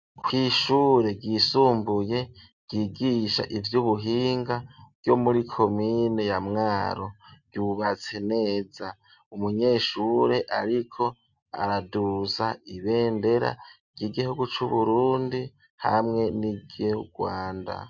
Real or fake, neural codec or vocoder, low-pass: real; none; 7.2 kHz